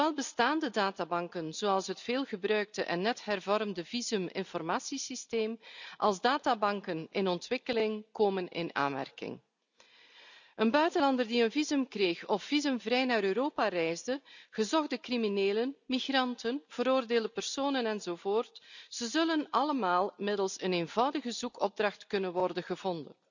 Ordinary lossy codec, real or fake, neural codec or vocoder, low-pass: none; real; none; 7.2 kHz